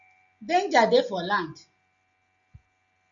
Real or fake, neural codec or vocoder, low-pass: real; none; 7.2 kHz